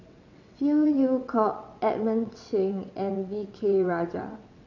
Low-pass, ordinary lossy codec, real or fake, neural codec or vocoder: 7.2 kHz; none; fake; vocoder, 22.05 kHz, 80 mel bands, WaveNeXt